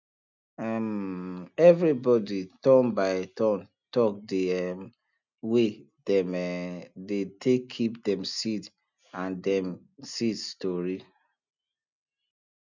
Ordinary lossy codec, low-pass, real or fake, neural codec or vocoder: none; 7.2 kHz; real; none